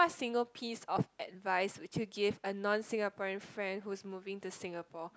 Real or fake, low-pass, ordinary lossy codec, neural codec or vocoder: real; none; none; none